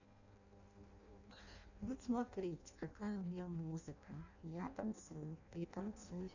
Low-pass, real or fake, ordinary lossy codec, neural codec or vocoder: 7.2 kHz; fake; none; codec, 16 kHz in and 24 kHz out, 0.6 kbps, FireRedTTS-2 codec